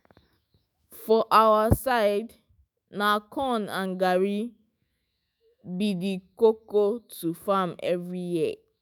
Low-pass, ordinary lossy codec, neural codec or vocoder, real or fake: none; none; autoencoder, 48 kHz, 128 numbers a frame, DAC-VAE, trained on Japanese speech; fake